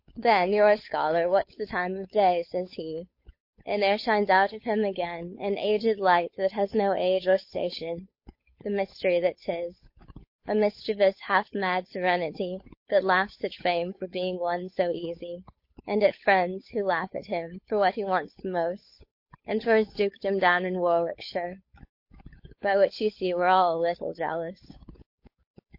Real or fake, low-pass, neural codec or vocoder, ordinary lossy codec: fake; 5.4 kHz; codec, 16 kHz, 8 kbps, FunCodec, trained on Chinese and English, 25 frames a second; MP3, 32 kbps